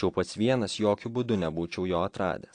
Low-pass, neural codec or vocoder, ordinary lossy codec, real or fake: 9.9 kHz; none; AAC, 48 kbps; real